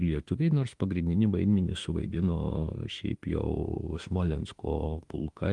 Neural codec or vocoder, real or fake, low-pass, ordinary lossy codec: autoencoder, 48 kHz, 32 numbers a frame, DAC-VAE, trained on Japanese speech; fake; 10.8 kHz; Opus, 24 kbps